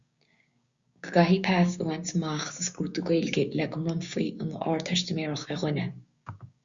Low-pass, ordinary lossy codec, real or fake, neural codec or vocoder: 7.2 kHz; Opus, 64 kbps; fake; codec, 16 kHz, 6 kbps, DAC